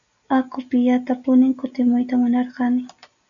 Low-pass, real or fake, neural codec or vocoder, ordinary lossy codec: 7.2 kHz; real; none; MP3, 96 kbps